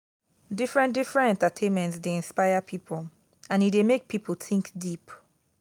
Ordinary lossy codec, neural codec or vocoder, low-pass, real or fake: none; none; 19.8 kHz; real